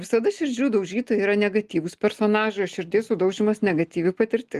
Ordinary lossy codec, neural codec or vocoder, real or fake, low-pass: Opus, 24 kbps; none; real; 14.4 kHz